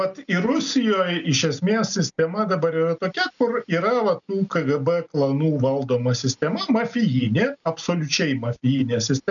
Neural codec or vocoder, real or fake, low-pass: none; real; 7.2 kHz